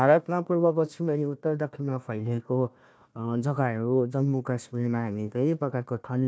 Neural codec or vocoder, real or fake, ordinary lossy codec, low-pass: codec, 16 kHz, 1 kbps, FunCodec, trained on Chinese and English, 50 frames a second; fake; none; none